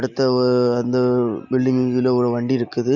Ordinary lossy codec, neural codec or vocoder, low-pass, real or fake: none; none; 7.2 kHz; real